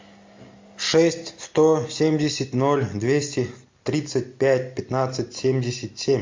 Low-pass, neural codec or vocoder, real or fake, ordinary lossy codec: 7.2 kHz; none; real; MP3, 48 kbps